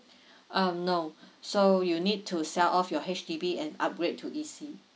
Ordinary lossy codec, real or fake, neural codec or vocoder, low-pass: none; real; none; none